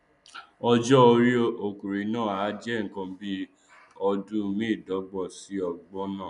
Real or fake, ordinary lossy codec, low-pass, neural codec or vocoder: real; none; 10.8 kHz; none